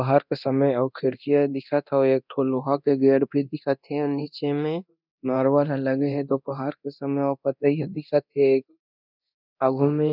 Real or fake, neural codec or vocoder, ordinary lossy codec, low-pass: fake; codec, 24 kHz, 0.9 kbps, DualCodec; none; 5.4 kHz